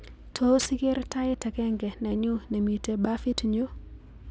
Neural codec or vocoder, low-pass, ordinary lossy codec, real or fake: none; none; none; real